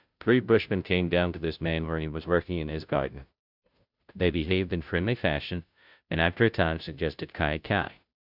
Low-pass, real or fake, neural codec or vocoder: 5.4 kHz; fake; codec, 16 kHz, 0.5 kbps, FunCodec, trained on Chinese and English, 25 frames a second